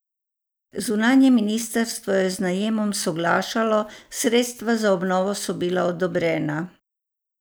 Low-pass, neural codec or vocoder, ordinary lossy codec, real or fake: none; none; none; real